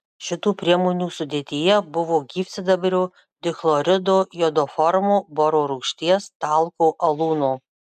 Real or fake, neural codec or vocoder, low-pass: real; none; 14.4 kHz